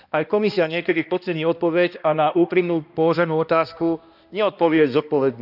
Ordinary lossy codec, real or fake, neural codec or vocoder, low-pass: none; fake; codec, 16 kHz, 1 kbps, X-Codec, HuBERT features, trained on balanced general audio; 5.4 kHz